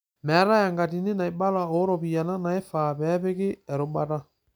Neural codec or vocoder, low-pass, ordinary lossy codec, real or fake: none; none; none; real